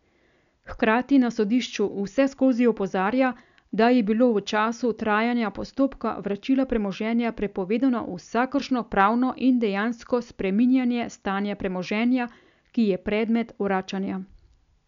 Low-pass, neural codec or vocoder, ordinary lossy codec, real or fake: 7.2 kHz; none; none; real